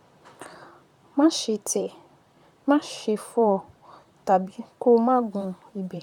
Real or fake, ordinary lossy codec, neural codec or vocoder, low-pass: fake; none; vocoder, 44.1 kHz, 128 mel bands, Pupu-Vocoder; 19.8 kHz